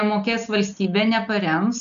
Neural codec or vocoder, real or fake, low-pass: none; real; 7.2 kHz